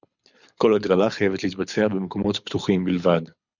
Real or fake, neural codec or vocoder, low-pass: fake; codec, 24 kHz, 6 kbps, HILCodec; 7.2 kHz